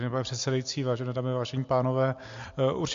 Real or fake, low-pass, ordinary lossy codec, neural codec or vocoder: real; 7.2 kHz; MP3, 48 kbps; none